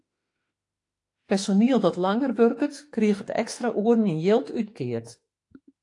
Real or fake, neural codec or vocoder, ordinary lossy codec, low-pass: fake; autoencoder, 48 kHz, 32 numbers a frame, DAC-VAE, trained on Japanese speech; AAC, 48 kbps; 10.8 kHz